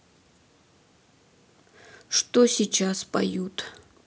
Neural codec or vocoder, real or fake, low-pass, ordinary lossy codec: none; real; none; none